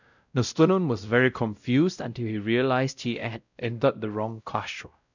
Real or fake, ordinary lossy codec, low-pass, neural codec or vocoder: fake; none; 7.2 kHz; codec, 16 kHz, 0.5 kbps, X-Codec, WavLM features, trained on Multilingual LibriSpeech